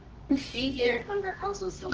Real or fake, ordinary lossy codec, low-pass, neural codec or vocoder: fake; Opus, 16 kbps; 7.2 kHz; codec, 24 kHz, 0.9 kbps, WavTokenizer, medium music audio release